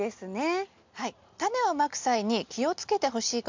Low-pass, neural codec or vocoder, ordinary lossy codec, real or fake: 7.2 kHz; none; MP3, 64 kbps; real